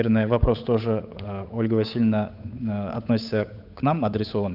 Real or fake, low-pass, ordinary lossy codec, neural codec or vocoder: fake; 5.4 kHz; none; codec, 16 kHz, 8 kbps, FunCodec, trained on Chinese and English, 25 frames a second